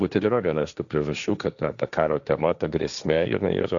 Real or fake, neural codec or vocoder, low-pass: fake; codec, 16 kHz, 1.1 kbps, Voila-Tokenizer; 7.2 kHz